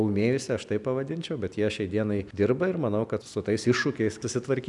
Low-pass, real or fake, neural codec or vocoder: 10.8 kHz; real; none